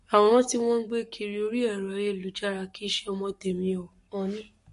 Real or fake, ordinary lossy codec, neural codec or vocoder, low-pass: fake; MP3, 48 kbps; codec, 44.1 kHz, 7.8 kbps, Pupu-Codec; 14.4 kHz